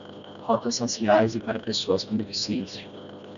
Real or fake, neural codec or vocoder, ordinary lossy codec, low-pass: fake; codec, 16 kHz, 1 kbps, FreqCodec, smaller model; MP3, 96 kbps; 7.2 kHz